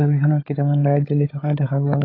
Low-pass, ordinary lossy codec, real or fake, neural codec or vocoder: 5.4 kHz; AAC, 24 kbps; fake; codec, 16 kHz, 16 kbps, FunCodec, trained on LibriTTS, 50 frames a second